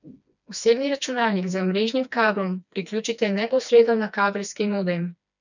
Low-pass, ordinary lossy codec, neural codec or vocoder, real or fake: 7.2 kHz; none; codec, 16 kHz, 2 kbps, FreqCodec, smaller model; fake